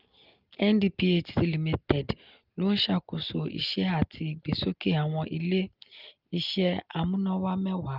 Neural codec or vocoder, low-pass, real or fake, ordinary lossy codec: none; 5.4 kHz; real; Opus, 24 kbps